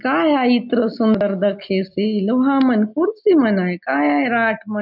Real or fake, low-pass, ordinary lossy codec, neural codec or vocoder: real; 5.4 kHz; none; none